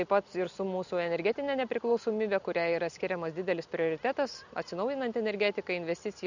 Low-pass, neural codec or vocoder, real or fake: 7.2 kHz; none; real